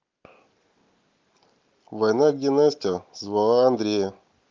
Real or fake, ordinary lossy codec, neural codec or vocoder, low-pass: real; Opus, 32 kbps; none; 7.2 kHz